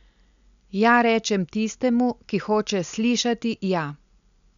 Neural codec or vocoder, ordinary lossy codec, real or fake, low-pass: none; none; real; 7.2 kHz